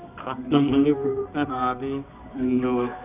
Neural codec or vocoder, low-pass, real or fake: codec, 24 kHz, 0.9 kbps, WavTokenizer, medium music audio release; 3.6 kHz; fake